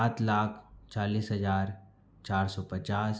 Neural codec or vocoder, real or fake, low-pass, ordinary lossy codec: none; real; none; none